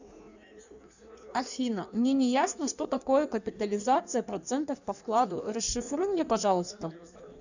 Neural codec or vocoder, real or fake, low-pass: codec, 16 kHz in and 24 kHz out, 1.1 kbps, FireRedTTS-2 codec; fake; 7.2 kHz